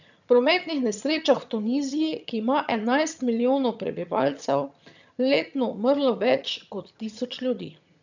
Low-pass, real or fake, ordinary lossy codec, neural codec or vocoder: 7.2 kHz; fake; none; vocoder, 22.05 kHz, 80 mel bands, HiFi-GAN